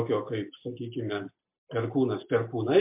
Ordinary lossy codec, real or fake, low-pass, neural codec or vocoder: MP3, 32 kbps; real; 3.6 kHz; none